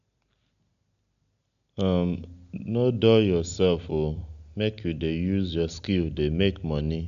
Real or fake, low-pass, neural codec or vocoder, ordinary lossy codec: real; 7.2 kHz; none; none